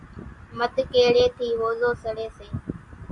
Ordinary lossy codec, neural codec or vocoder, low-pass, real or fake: MP3, 64 kbps; none; 10.8 kHz; real